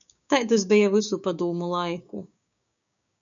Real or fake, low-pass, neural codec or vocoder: fake; 7.2 kHz; codec, 16 kHz, 6 kbps, DAC